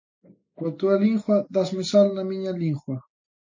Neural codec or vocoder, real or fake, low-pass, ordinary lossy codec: none; real; 7.2 kHz; MP3, 32 kbps